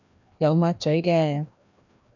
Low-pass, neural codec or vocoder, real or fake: 7.2 kHz; codec, 16 kHz, 1 kbps, FreqCodec, larger model; fake